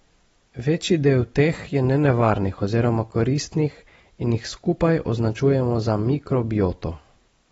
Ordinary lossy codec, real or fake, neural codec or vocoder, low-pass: AAC, 24 kbps; real; none; 14.4 kHz